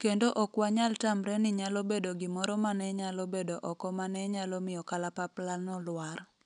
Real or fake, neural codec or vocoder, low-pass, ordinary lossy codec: real; none; 9.9 kHz; none